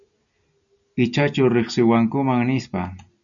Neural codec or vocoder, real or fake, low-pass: none; real; 7.2 kHz